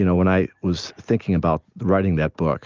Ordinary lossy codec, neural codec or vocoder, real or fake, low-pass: Opus, 24 kbps; none; real; 7.2 kHz